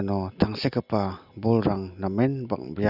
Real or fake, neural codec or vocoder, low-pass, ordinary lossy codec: real; none; 5.4 kHz; none